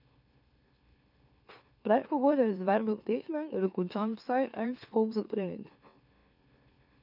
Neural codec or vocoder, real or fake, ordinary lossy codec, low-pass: autoencoder, 44.1 kHz, a latent of 192 numbers a frame, MeloTTS; fake; none; 5.4 kHz